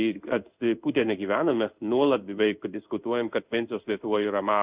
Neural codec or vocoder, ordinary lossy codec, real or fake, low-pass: codec, 16 kHz in and 24 kHz out, 1 kbps, XY-Tokenizer; Opus, 64 kbps; fake; 3.6 kHz